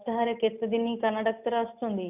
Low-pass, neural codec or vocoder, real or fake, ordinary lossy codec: 3.6 kHz; none; real; none